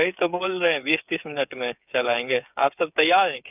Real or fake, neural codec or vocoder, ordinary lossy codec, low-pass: fake; codec, 16 kHz, 8 kbps, FreqCodec, smaller model; none; 3.6 kHz